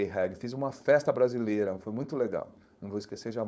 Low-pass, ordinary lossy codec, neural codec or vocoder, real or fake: none; none; codec, 16 kHz, 4.8 kbps, FACodec; fake